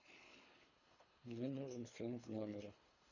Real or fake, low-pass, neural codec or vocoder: fake; 7.2 kHz; codec, 24 kHz, 3 kbps, HILCodec